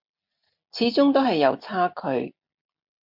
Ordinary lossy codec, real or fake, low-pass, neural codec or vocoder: MP3, 48 kbps; real; 5.4 kHz; none